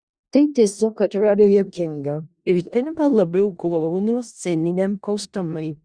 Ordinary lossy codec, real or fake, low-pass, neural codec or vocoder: Opus, 64 kbps; fake; 9.9 kHz; codec, 16 kHz in and 24 kHz out, 0.4 kbps, LongCat-Audio-Codec, four codebook decoder